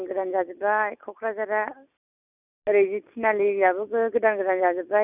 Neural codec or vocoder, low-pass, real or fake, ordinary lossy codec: none; 3.6 kHz; real; none